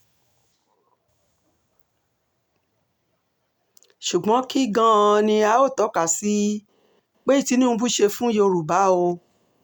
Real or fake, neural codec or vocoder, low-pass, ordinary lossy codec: real; none; none; none